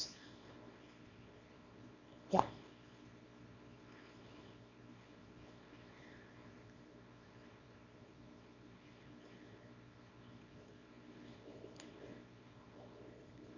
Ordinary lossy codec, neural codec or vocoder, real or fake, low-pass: none; codec, 24 kHz, 0.9 kbps, WavTokenizer, small release; fake; 7.2 kHz